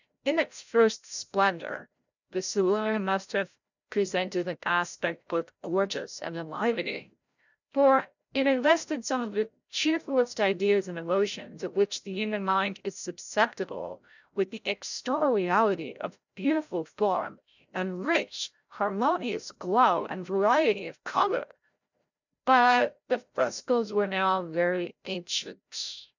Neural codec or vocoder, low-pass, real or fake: codec, 16 kHz, 0.5 kbps, FreqCodec, larger model; 7.2 kHz; fake